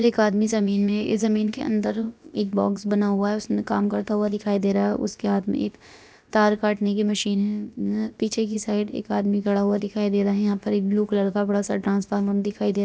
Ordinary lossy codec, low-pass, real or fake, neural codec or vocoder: none; none; fake; codec, 16 kHz, about 1 kbps, DyCAST, with the encoder's durations